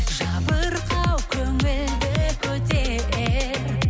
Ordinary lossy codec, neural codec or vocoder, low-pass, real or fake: none; none; none; real